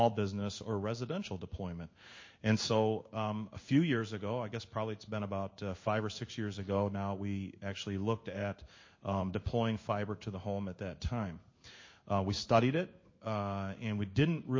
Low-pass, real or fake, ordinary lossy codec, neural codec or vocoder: 7.2 kHz; fake; MP3, 32 kbps; codec, 16 kHz in and 24 kHz out, 1 kbps, XY-Tokenizer